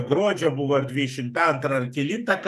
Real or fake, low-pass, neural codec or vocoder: fake; 14.4 kHz; codec, 44.1 kHz, 2.6 kbps, SNAC